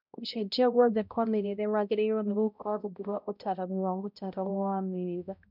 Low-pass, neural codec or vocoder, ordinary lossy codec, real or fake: 5.4 kHz; codec, 16 kHz, 0.5 kbps, X-Codec, HuBERT features, trained on balanced general audio; none; fake